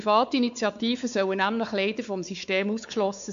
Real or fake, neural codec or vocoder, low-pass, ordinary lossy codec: fake; codec, 16 kHz, 4 kbps, X-Codec, WavLM features, trained on Multilingual LibriSpeech; 7.2 kHz; AAC, 96 kbps